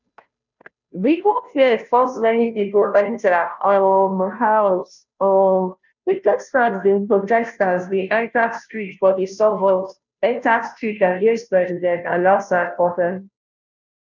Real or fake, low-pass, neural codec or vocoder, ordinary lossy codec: fake; 7.2 kHz; codec, 16 kHz, 0.5 kbps, FunCodec, trained on Chinese and English, 25 frames a second; none